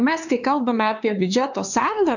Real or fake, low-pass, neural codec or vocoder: fake; 7.2 kHz; codec, 16 kHz, 4 kbps, X-Codec, HuBERT features, trained on LibriSpeech